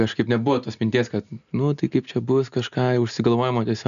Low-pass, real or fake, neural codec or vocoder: 7.2 kHz; real; none